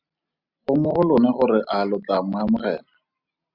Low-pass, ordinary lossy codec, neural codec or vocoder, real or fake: 5.4 kHz; Opus, 64 kbps; none; real